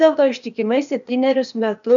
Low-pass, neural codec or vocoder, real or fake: 7.2 kHz; codec, 16 kHz, 0.8 kbps, ZipCodec; fake